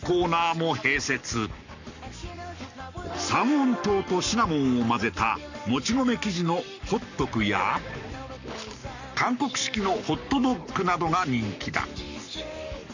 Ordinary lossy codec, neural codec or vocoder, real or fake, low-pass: none; codec, 44.1 kHz, 7.8 kbps, Pupu-Codec; fake; 7.2 kHz